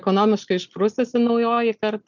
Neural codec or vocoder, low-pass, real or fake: none; 7.2 kHz; real